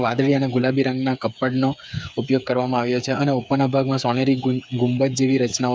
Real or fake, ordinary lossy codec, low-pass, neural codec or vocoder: fake; none; none; codec, 16 kHz, 8 kbps, FreqCodec, smaller model